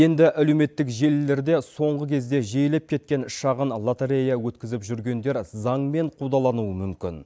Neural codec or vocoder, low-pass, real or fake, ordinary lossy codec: none; none; real; none